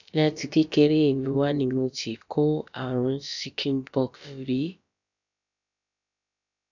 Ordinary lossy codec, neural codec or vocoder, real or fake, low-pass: none; codec, 16 kHz, about 1 kbps, DyCAST, with the encoder's durations; fake; 7.2 kHz